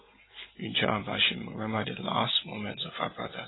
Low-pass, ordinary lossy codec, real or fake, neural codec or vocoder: 7.2 kHz; AAC, 16 kbps; real; none